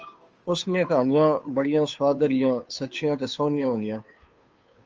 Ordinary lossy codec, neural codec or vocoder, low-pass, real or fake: Opus, 24 kbps; codec, 16 kHz, 2 kbps, FunCodec, trained on Chinese and English, 25 frames a second; 7.2 kHz; fake